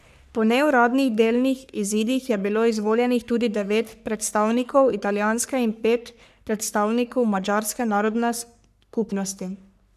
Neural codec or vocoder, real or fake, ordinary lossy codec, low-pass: codec, 44.1 kHz, 3.4 kbps, Pupu-Codec; fake; none; 14.4 kHz